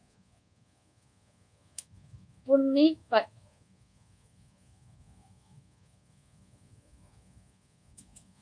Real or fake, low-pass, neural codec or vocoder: fake; 9.9 kHz; codec, 24 kHz, 1.2 kbps, DualCodec